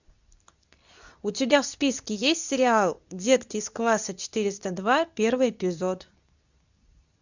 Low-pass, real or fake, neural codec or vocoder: 7.2 kHz; fake; codec, 24 kHz, 0.9 kbps, WavTokenizer, medium speech release version 2